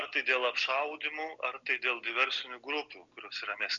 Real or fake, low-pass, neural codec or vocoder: real; 7.2 kHz; none